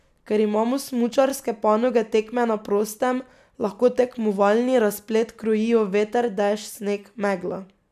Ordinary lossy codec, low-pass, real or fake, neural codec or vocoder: none; 14.4 kHz; real; none